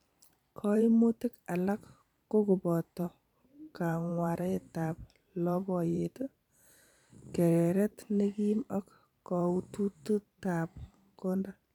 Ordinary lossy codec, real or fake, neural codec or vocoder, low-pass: none; fake; vocoder, 44.1 kHz, 128 mel bands every 512 samples, BigVGAN v2; 19.8 kHz